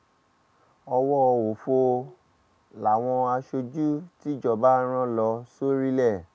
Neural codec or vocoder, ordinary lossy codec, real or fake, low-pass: none; none; real; none